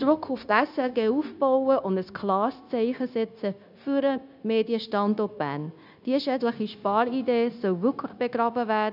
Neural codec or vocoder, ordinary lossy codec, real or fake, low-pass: codec, 16 kHz, 0.9 kbps, LongCat-Audio-Codec; none; fake; 5.4 kHz